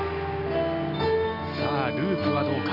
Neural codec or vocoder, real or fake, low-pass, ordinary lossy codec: none; real; 5.4 kHz; none